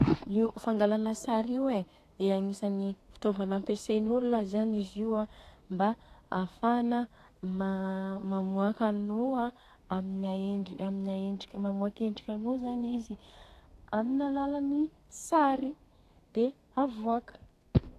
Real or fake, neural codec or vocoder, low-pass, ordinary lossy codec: fake; codec, 32 kHz, 1.9 kbps, SNAC; 14.4 kHz; AAC, 64 kbps